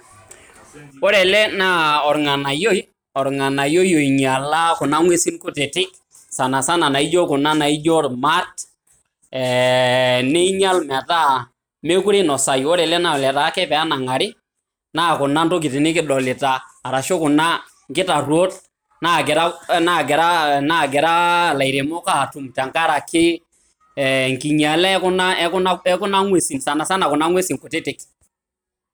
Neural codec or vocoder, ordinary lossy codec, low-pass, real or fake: none; none; none; real